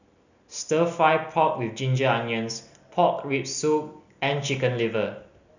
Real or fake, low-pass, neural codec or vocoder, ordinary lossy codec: real; 7.2 kHz; none; none